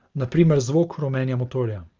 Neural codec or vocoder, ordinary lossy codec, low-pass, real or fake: none; Opus, 24 kbps; 7.2 kHz; real